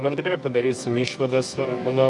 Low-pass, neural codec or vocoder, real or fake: 10.8 kHz; codec, 24 kHz, 0.9 kbps, WavTokenizer, medium music audio release; fake